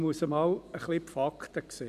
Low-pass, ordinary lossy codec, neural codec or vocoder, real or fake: 14.4 kHz; none; vocoder, 44.1 kHz, 128 mel bands every 256 samples, BigVGAN v2; fake